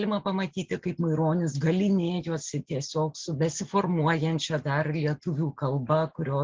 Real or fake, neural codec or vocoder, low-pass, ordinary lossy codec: real; none; 7.2 kHz; Opus, 16 kbps